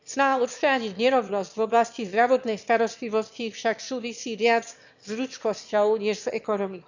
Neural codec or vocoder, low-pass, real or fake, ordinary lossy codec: autoencoder, 22.05 kHz, a latent of 192 numbers a frame, VITS, trained on one speaker; 7.2 kHz; fake; none